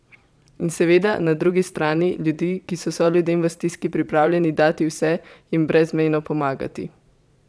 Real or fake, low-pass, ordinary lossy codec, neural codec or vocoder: fake; none; none; vocoder, 22.05 kHz, 80 mel bands, Vocos